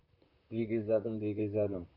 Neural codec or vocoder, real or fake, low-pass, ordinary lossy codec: vocoder, 44.1 kHz, 128 mel bands, Pupu-Vocoder; fake; 5.4 kHz; none